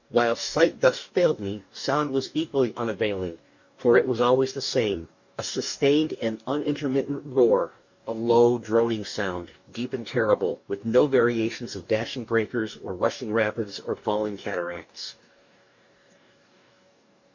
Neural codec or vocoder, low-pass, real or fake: codec, 44.1 kHz, 2.6 kbps, DAC; 7.2 kHz; fake